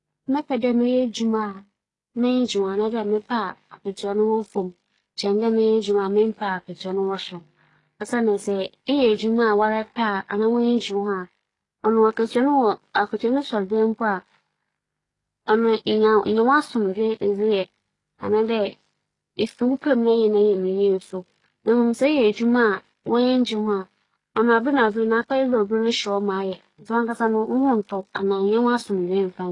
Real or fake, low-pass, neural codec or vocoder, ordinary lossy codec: fake; 10.8 kHz; codec, 44.1 kHz, 7.8 kbps, DAC; AAC, 32 kbps